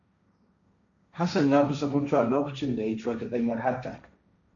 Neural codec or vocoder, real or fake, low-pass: codec, 16 kHz, 1.1 kbps, Voila-Tokenizer; fake; 7.2 kHz